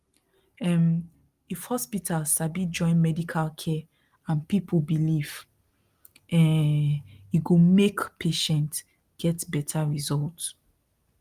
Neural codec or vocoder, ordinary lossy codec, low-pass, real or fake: none; Opus, 32 kbps; 14.4 kHz; real